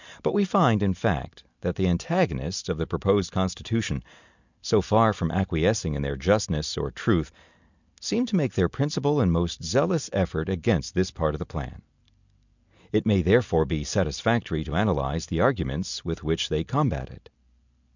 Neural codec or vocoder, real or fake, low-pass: none; real; 7.2 kHz